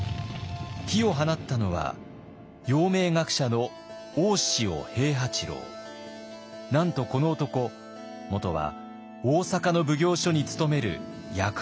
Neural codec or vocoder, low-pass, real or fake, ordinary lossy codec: none; none; real; none